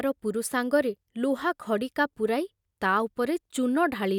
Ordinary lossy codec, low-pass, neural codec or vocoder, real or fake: none; 19.8 kHz; none; real